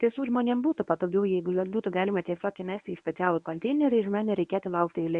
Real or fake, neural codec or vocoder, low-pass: fake; codec, 24 kHz, 0.9 kbps, WavTokenizer, medium speech release version 1; 10.8 kHz